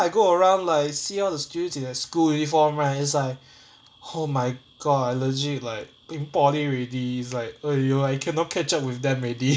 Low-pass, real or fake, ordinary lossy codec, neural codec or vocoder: none; real; none; none